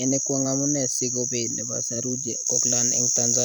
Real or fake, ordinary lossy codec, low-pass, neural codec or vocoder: fake; none; none; vocoder, 44.1 kHz, 128 mel bands every 256 samples, BigVGAN v2